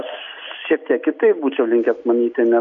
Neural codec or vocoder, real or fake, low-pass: none; real; 7.2 kHz